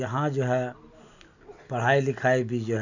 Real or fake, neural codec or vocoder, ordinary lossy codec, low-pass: real; none; none; 7.2 kHz